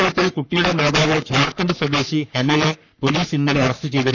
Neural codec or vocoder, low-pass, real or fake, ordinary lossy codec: codec, 44.1 kHz, 3.4 kbps, Pupu-Codec; 7.2 kHz; fake; none